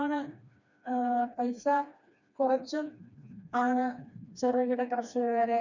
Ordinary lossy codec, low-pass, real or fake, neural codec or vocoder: none; 7.2 kHz; fake; codec, 16 kHz, 2 kbps, FreqCodec, smaller model